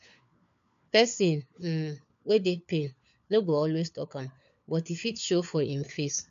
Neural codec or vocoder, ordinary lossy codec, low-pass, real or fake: codec, 16 kHz, 4 kbps, FunCodec, trained on LibriTTS, 50 frames a second; MP3, 64 kbps; 7.2 kHz; fake